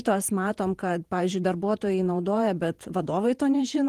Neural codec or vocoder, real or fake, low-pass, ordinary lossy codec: vocoder, 48 kHz, 128 mel bands, Vocos; fake; 14.4 kHz; Opus, 24 kbps